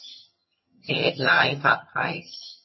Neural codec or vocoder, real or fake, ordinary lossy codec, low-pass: vocoder, 22.05 kHz, 80 mel bands, HiFi-GAN; fake; MP3, 24 kbps; 7.2 kHz